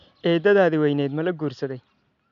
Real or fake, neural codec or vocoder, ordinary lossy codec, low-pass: real; none; none; 7.2 kHz